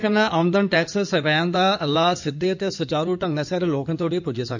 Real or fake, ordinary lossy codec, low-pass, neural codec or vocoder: fake; none; 7.2 kHz; codec, 16 kHz in and 24 kHz out, 2.2 kbps, FireRedTTS-2 codec